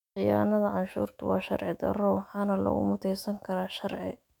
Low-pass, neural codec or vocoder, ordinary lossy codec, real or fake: 19.8 kHz; autoencoder, 48 kHz, 128 numbers a frame, DAC-VAE, trained on Japanese speech; none; fake